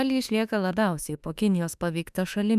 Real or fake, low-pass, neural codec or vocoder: fake; 14.4 kHz; autoencoder, 48 kHz, 32 numbers a frame, DAC-VAE, trained on Japanese speech